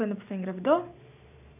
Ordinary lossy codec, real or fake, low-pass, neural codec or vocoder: none; real; 3.6 kHz; none